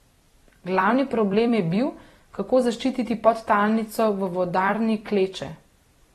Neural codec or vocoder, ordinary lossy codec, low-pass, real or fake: vocoder, 44.1 kHz, 128 mel bands every 256 samples, BigVGAN v2; AAC, 32 kbps; 19.8 kHz; fake